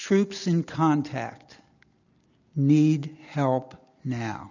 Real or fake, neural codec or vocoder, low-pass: real; none; 7.2 kHz